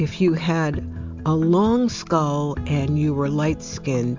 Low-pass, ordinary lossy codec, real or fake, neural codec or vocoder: 7.2 kHz; MP3, 64 kbps; real; none